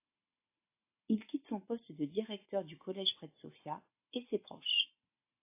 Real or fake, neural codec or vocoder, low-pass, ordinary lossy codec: real; none; 3.6 kHz; AAC, 32 kbps